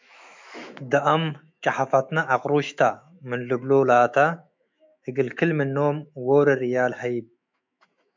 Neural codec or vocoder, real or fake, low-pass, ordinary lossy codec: autoencoder, 48 kHz, 128 numbers a frame, DAC-VAE, trained on Japanese speech; fake; 7.2 kHz; MP3, 64 kbps